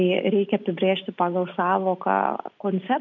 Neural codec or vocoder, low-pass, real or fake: none; 7.2 kHz; real